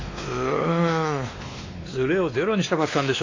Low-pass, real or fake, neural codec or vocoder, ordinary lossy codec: 7.2 kHz; fake; codec, 16 kHz, 2 kbps, X-Codec, WavLM features, trained on Multilingual LibriSpeech; AAC, 32 kbps